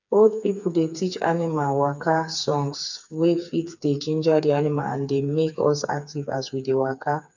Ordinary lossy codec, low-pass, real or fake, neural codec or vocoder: none; 7.2 kHz; fake; codec, 16 kHz, 4 kbps, FreqCodec, smaller model